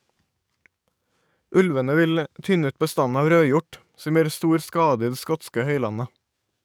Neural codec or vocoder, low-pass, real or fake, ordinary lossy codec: codec, 44.1 kHz, 7.8 kbps, DAC; none; fake; none